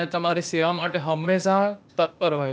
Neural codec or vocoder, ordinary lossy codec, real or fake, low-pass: codec, 16 kHz, 0.8 kbps, ZipCodec; none; fake; none